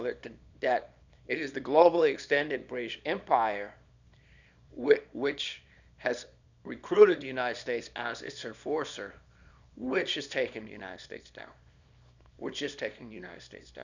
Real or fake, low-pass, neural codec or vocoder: fake; 7.2 kHz; codec, 24 kHz, 0.9 kbps, WavTokenizer, small release